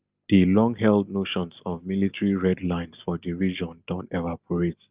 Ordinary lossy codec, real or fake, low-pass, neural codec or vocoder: Opus, 32 kbps; real; 3.6 kHz; none